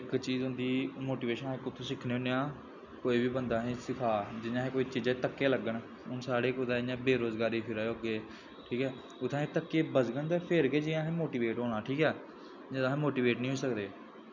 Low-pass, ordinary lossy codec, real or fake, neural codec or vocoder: 7.2 kHz; none; real; none